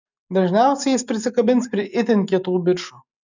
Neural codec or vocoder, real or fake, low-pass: none; real; 7.2 kHz